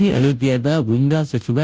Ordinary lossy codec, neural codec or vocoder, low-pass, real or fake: none; codec, 16 kHz, 0.5 kbps, FunCodec, trained on Chinese and English, 25 frames a second; none; fake